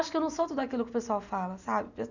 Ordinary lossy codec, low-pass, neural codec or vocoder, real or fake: none; 7.2 kHz; none; real